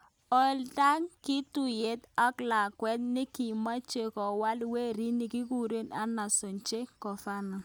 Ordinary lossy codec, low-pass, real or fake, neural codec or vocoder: none; none; real; none